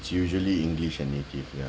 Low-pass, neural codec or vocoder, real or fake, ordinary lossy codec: none; none; real; none